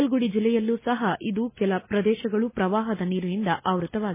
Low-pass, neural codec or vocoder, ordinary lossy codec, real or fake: 3.6 kHz; none; MP3, 16 kbps; real